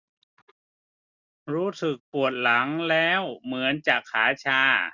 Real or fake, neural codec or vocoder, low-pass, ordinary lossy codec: real; none; 7.2 kHz; none